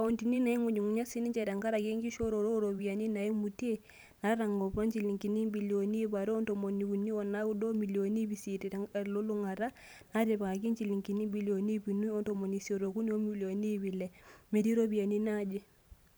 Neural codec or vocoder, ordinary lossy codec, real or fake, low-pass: vocoder, 44.1 kHz, 128 mel bands every 512 samples, BigVGAN v2; none; fake; none